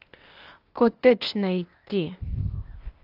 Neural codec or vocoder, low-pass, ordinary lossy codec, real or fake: codec, 16 kHz, 0.8 kbps, ZipCodec; 5.4 kHz; Opus, 24 kbps; fake